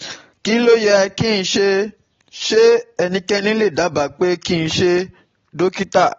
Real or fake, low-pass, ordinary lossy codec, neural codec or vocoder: real; 7.2 kHz; AAC, 24 kbps; none